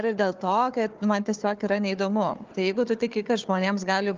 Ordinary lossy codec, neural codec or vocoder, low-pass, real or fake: Opus, 32 kbps; codec, 16 kHz, 4 kbps, FunCodec, trained on Chinese and English, 50 frames a second; 7.2 kHz; fake